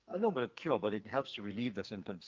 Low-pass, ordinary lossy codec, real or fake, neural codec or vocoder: 7.2 kHz; Opus, 24 kbps; fake; codec, 44.1 kHz, 2.6 kbps, SNAC